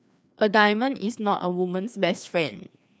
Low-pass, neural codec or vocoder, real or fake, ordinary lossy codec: none; codec, 16 kHz, 2 kbps, FreqCodec, larger model; fake; none